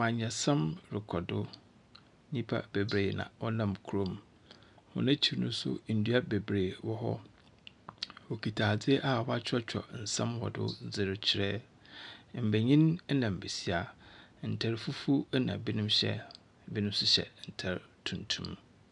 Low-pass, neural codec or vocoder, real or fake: 10.8 kHz; none; real